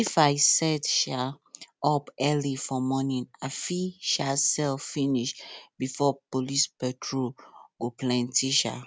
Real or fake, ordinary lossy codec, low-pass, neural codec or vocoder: real; none; none; none